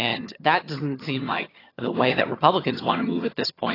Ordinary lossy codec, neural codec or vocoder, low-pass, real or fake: AAC, 24 kbps; vocoder, 22.05 kHz, 80 mel bands, HiFi-GAN; 5.4 kHz; fake